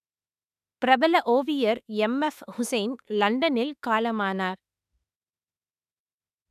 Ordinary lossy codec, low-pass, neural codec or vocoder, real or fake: none; 14.4 kHz; autoencoder, 48 kHz, 32 numbers a frame, DAC-VAE, trained on Japanese speech; fake